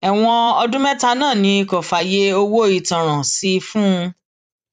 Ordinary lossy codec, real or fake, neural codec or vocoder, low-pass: none; fake; vocoder, 44.1 kHz, 128 mel bands every 256 samples, BigVGAN v2; 14.4 kHz